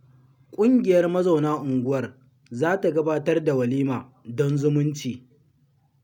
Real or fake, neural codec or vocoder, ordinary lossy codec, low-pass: real; none; none; 19.8 kHz